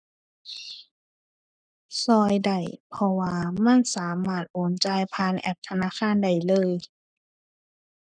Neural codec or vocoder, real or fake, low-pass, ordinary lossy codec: codec, 44.1 kHz, 7.8 kbps, Pupu-Codec; fake; 9.9 kHz; none